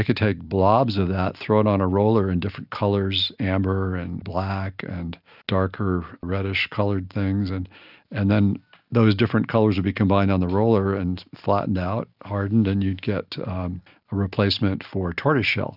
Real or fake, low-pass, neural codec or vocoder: real; 5.4 kHz; none